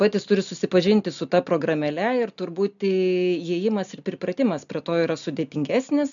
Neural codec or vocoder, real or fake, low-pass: none; real; 7.2 kHz